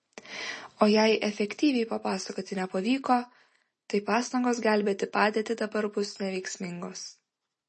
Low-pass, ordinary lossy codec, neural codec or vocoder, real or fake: 10.8 kHz; MP3, 32 kbps; none; real